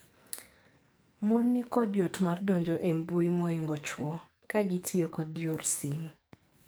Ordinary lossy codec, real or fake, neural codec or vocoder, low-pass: none; fake; codec, 44.1 kHz, 2.6 kbps, SNAC; none